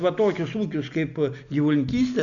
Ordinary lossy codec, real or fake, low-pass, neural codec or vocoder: MP3, 96 kbps; real; 7.2 kHz; none